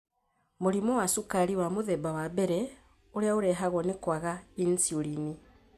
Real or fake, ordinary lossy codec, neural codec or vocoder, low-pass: real; none; none; 14.4 kHz